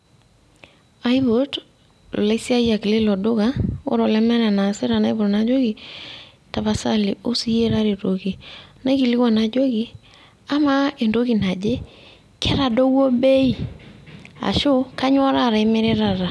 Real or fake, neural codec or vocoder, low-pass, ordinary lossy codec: real; none; none; none